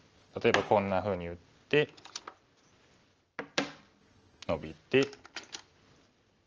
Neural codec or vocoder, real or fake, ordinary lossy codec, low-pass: none; real; Opus, 24 kbps; 7.2 kHz